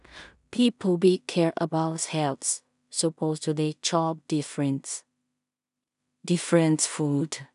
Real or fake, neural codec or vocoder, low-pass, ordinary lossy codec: fake; codec, 16 kHz in and 24 kHz out, 0.4 kbps, LongCat-Audio-Codec, two codebook decoder; 10.8 kHz; none